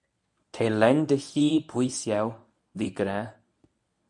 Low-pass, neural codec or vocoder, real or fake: 10.8 kHz; codec, 24 kHz, 0.9 kbps, WavTokenizer, medium speech release version 1; fake